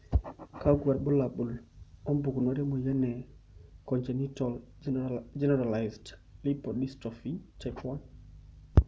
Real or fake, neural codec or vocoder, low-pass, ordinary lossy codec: real; none; none; none